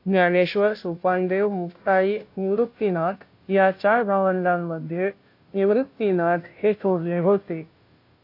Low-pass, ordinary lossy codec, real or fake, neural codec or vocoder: 5.4 kHz; AAC, 32 kbps; fake; codec, 16 kHz, 0.5 kbps, FunCodec, trained on Chinese and English, 25 frames a second